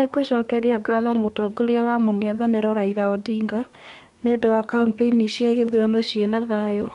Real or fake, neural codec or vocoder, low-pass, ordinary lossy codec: fake; codec, 24 kHz, 1 kbps, SNAC; 10.8 kHz; none